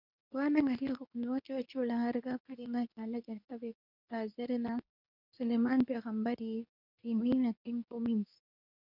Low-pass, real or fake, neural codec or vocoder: 5.4 kHz; fake; codec, 24 kHz, 0.9 kbps, WavTokenizer, medium speech release version 2